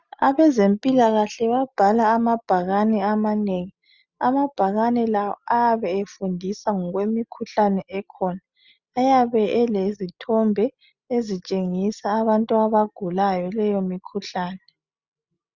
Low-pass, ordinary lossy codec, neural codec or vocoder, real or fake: 7.2 kHz; Opus, 64 kbps; none; real